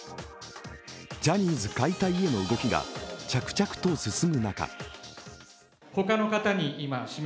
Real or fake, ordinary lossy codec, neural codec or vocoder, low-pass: real; none; none; none